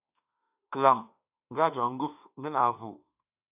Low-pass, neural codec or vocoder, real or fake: 3.6 kHz; autoencoder, 48 kHz, 32 numbers a frame, DAC-VAE, trained on Japanese speech; fake